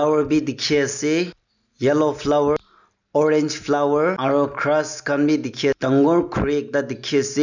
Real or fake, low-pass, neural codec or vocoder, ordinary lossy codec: real; 7.2 kHz; none; none